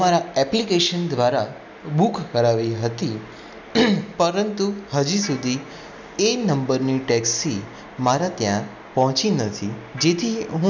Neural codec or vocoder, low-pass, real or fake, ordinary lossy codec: none; 7.2 kHz; real; none